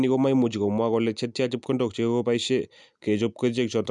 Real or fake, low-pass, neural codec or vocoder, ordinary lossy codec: real; 10.8 kHz; none; none